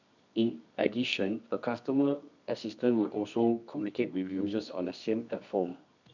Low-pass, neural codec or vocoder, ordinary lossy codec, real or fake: 7.2 kHz; codec, 24 kHz, 0.9 kbps, WavTokenizer, medium music audio release; none; fake